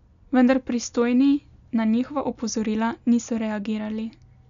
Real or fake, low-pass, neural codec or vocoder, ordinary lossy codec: real; 7.2 kHz; none; none